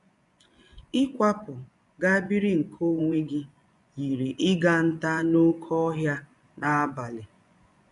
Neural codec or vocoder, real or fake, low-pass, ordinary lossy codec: vocoder, 24 kHz, 100 mel bands, Vocos; fake; 10.8 kHz; none